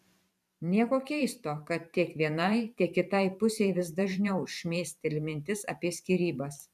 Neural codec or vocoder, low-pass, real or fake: vocoder, 44.1 kHz, 128 mel bands every 512 samples, BigVGAN v2; 14.4 kHz; fake